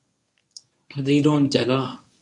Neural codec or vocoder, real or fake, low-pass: codec, 24 kHz, 0.9 kbps, WavTokenizer, medium speech release version 1; fake; 10.8 kHz